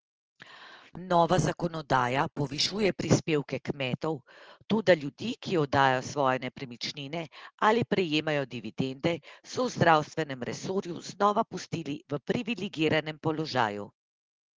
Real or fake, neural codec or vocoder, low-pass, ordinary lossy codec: real; none; 7.2 kHz; Opus, 16 kbps